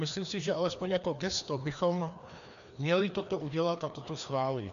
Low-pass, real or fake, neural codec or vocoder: 7.2 kHz; fake; codec, 16 kHz, 2 kbps, FreqCodec, larger model